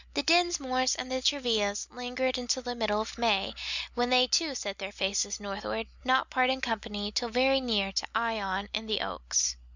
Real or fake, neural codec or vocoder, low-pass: real; none; 7.2 kHz